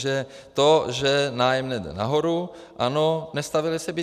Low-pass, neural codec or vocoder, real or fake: 14.4 kHz; none; real